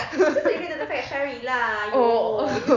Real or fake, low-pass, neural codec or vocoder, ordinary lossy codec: real; 7.2 kHz; none; none